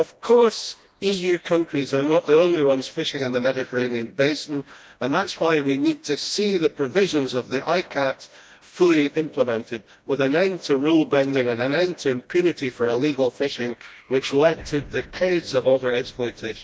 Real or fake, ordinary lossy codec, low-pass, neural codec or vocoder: fake; none; none; codec, 16 kHz, 1 kbps, FreqCodec, smaller model